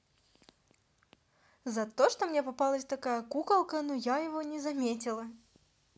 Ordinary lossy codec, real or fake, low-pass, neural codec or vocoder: none; real; none; none